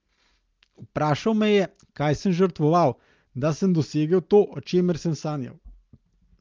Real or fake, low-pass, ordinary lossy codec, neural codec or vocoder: real; 7.2 kHz; Opus, 32 kbps; none